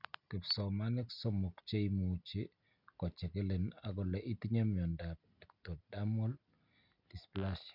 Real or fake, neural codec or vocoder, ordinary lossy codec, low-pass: real; none; none; 5.4 kHz